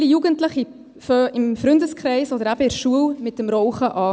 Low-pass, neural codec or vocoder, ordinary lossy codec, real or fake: none; none; none; real